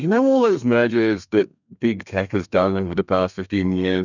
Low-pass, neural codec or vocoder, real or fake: 7.2 kHz; codec, 32 kHz, 1.9 kbps, SNAC; fake